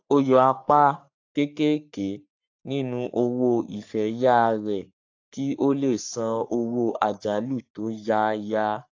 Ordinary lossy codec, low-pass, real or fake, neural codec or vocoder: AAC, 48 kbps; 7.2 kHz; fake; codec, 44.1 kHz, 3.4 kbps, Pupu-Codec